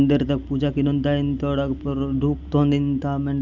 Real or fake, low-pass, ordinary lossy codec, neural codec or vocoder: real; 7.2 kHz; none; none